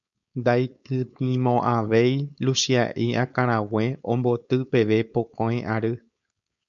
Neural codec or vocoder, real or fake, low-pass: codec, 16 kHz, 4.8 kbps, FACodec; fake; 7.2 kHz